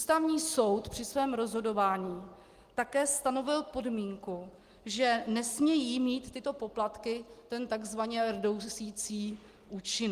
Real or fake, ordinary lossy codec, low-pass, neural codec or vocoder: real; Opus, 24 kbps; 14.4 kHz; none